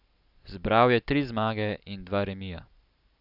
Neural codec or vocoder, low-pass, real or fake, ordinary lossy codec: none; 5.4 kHz; real; none